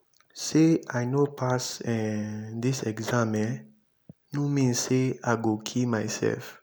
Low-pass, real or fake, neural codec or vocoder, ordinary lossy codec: none; real; none; none